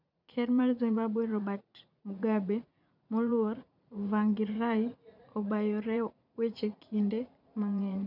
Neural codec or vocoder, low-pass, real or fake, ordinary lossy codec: vocoder, 44.1 kHz, 80 mel bands, Vocos; 5.4 kHz; fake; none